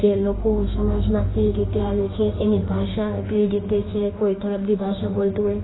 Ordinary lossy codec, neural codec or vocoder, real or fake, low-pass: AAC, 16 kbps; autoencoder, 48 kHz, 32 numbers a frame, DAC-VAE, trained on Japanese speech; fake; 7.2 kHz